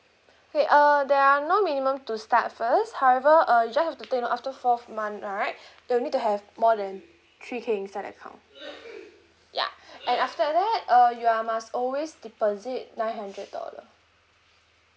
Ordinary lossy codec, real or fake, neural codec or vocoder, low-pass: none; real; none; none